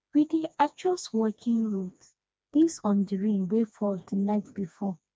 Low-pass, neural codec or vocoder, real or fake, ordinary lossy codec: none; codec, 16 kHz, 2 kbps, FreqCodec, smaller model; fake; none